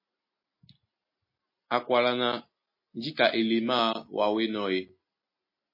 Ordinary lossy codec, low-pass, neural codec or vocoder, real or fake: MP3, 24 kbps; 5.4 kHz; none; real